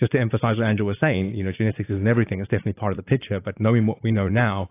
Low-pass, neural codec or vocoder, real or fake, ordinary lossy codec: 3.6 kHz; vocoder, 44.1 kHz, 80 mel bands, Vocos; fake; AAC, 24 kbps